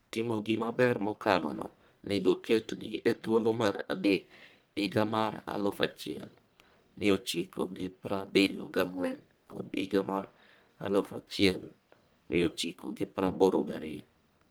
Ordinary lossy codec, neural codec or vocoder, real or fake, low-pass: none; codec, 44.1 kHz, 1.7 kbps, Pupu-Codec; fake; none